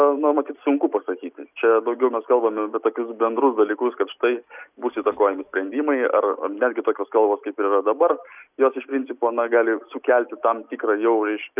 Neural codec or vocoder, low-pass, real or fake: none; 3.6 kHz; real